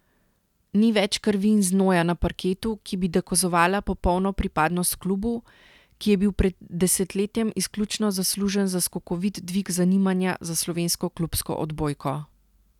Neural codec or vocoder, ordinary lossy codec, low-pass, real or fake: none; none; 19.8 kHz; real